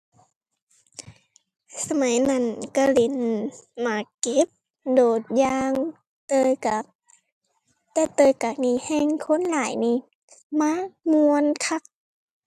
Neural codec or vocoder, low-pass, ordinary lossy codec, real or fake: none; 14.4 kHz; AAC, 96 kbps; real